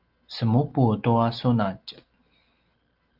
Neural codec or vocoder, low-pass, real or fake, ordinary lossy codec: none; 5.4 kHz; real; Opus, 32 kbps